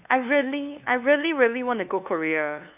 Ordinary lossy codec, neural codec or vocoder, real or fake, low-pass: none; codec, 16 kHz, 2 kbps, FunCodec, trained on LibriTTS, 25 frames a second; fake; 3.6 kHz